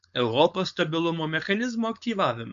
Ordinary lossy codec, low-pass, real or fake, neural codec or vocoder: MP3, 64 kbps; 7.2 kHz; fake; codec, 16 kHz, 4.8 kbps, FACodec